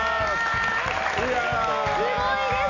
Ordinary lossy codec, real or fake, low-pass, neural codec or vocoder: none; real; 7.2 kHz; none